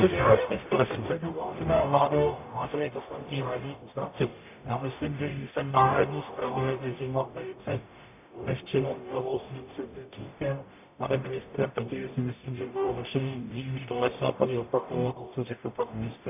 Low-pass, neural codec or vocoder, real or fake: 3.6 kHz; codec, 44.1 kHz, 0.9 kbps, DAC; fake